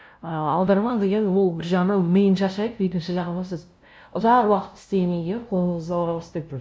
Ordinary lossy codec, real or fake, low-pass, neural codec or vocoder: none; fake; none; codec, 16 kHz, 0.5 kbps, FunCodec, trained on LibriTTS, 25 frames a second